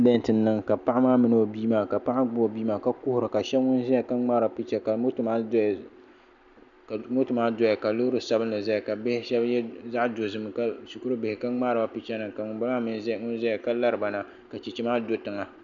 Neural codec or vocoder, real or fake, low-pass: none; real; 7.2 kHz